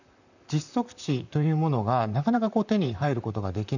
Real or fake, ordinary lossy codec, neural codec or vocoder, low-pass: fake; none; vocoder, 44.1 kHz, 128 mel bands, Pupu-Vocoder; 7.2 kHz